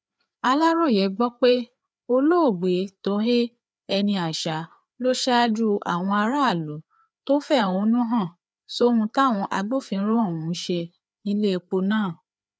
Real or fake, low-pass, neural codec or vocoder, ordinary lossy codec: fake; none; codec, 16 kHz, 4 kbps, FreqCodec, larger model; none